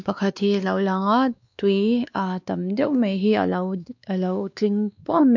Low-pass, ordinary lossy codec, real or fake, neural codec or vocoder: 7.2 kHz; none; fake; codec, 16 kHz, 2 kbps, X-Codec, WavLM features, trained on Multilingual LibriSpeech